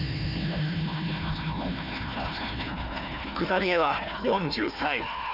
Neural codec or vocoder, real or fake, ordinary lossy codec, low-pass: codec, 16 kHz, 1 kbps, FunCodec, trained on Chinese and English, 50 frames a second; fake; none; 5.4 kHz